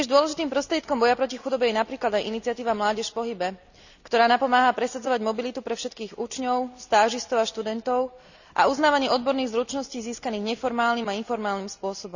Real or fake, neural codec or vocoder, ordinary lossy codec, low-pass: real; none; none; 7.2 kHz